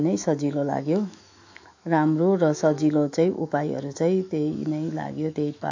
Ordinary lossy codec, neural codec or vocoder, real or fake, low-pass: AAC, 48 kbps; autoencoder, 48 kHz, 128 numbers a frame, DAC-VAE, trained on Japanese speech; fake; 7.2 kHz